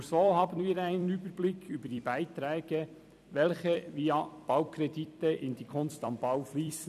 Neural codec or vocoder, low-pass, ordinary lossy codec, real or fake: vocoder, 44.1 kHz, 128 mel bands every 256 samples, BigVGAN v2; 14.4 kHz; none; fake